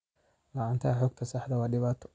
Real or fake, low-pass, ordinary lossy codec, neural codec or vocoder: real; none; none; none